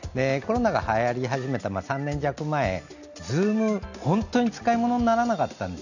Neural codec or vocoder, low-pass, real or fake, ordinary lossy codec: none; 7.2 kHz; real; none